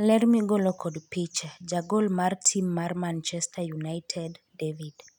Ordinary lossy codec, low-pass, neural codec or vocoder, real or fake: none; 19.8 kHz; none; real